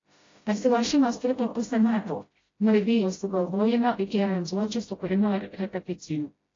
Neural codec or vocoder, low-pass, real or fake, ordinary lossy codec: codec, 16 kHz, 0.5 kbps, FreqCodec, smaller model; 7.2 kHz; fake; AAC, 32 kbps